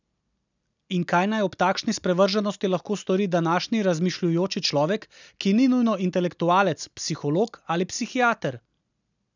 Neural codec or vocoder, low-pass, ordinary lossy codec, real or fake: none; 7.2 kHz; none; real